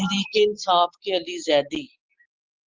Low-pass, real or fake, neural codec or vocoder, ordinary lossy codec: 7.2 kHz; real; none; Opus, 32 kbps